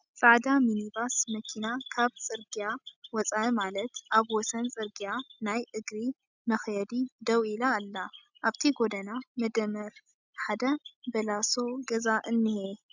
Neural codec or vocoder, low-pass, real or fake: none; 7.2 kHz; real